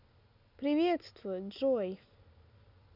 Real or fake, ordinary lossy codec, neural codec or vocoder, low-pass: real; none; none; 5.4 kHz